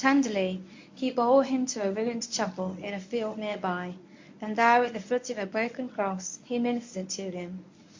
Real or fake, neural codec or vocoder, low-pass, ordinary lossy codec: fake; codec, 24 kHz, 0.9 kbps, WavTokenizer, medium speech release version 1; 7.2 kHz; MP3, 48 kbps